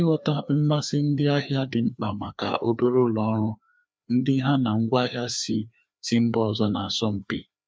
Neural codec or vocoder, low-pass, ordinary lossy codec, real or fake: codec, 16 kHz, 2 kbps, FreqCodec, larger model; none; none; fake